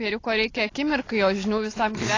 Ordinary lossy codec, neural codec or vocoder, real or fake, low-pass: AAC, 32 kbps; none; real; 7.2 kHz